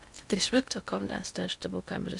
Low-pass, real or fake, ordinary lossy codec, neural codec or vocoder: 10.8 kHz; fake; none; codec, 16 kHz in and 24 kHz out, 0.6 kbps, FocalCodec, streaming, 2048 codes